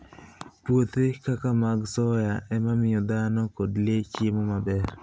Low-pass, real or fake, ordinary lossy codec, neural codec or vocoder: none; real; none; none